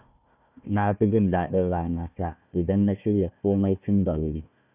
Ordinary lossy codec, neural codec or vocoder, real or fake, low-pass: none; codec, 16 kHz, 1 kbps, FunCodec, trained on Chinese and English, 50 frames a second; fake; 3.6 kHz